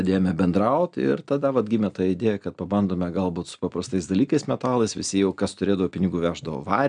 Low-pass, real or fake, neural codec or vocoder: 9.9 kHz; real; none